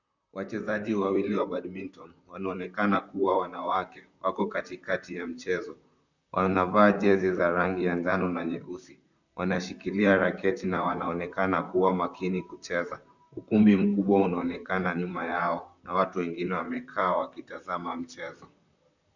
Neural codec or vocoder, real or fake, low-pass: vocoder, 44.1 kHz, 128 mel bands, Pupu-Vocoder; fake; 7.2 kHz